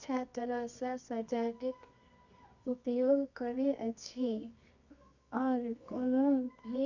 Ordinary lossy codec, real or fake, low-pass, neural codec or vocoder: none; fake; 7.2 kHz; codec, 24 kHz, 0.9 kbps, WavTokenizer, medium music audio release